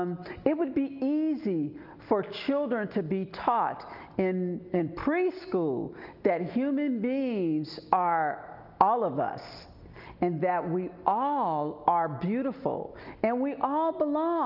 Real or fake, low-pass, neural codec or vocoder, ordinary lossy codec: real; 5.4 kHz; none; Opus, 24 kbps